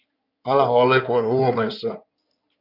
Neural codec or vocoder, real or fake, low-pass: codec, 16 kHz in and 24 kHz out, 2.2 kbps, FireRedTTS-2 codec; fake; 5.4 kHz